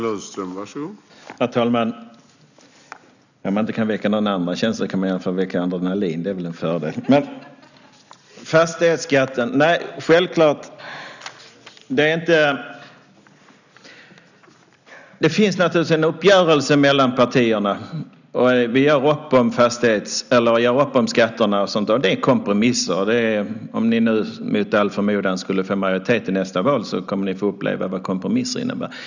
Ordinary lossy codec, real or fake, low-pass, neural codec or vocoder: none; real; 7.2 kHz; none